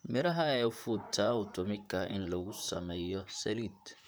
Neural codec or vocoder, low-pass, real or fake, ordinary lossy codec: vocoder, 44.1 kHz, 128 mel bands, Pupu-Vocoder; none; fake; none